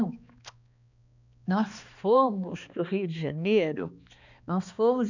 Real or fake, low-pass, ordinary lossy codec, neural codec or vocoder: fake; 7.2 kHz; none; codec, 16 kHz, 2 kbps, X-Codec, HuBERT features, trained on balanced general audio